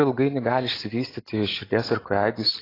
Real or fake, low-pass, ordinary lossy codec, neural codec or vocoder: real; 5.4 kHz; AAC, 24 kbps; none